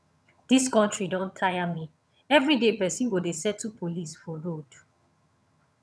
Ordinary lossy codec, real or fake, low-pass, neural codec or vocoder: none; fake; none; vocoder, 22.05 kHz, 80 mel bands, HiFi-GAN